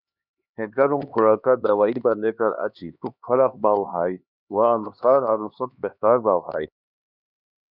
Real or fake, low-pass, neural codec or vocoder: fake; 5.4 kHz; codec, 16 kHz, 2 kbps, X-Codec, HuBERT features, trained on LibriSpeech